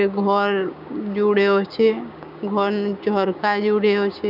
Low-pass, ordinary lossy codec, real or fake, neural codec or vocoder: 5.4 kHz; none; fake; codec, 16 kHz, 6 kbps, DAC